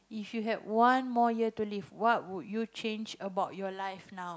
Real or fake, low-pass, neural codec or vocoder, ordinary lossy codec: real; none; none; none